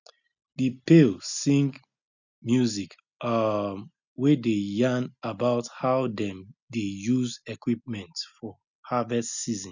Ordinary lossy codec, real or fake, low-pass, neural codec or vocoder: none; real; 7.2 kHz; none